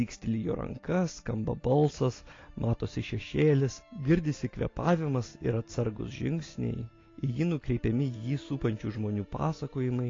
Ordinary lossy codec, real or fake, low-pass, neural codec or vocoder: AAC, 32 kbps; real; 7.2 kHz; none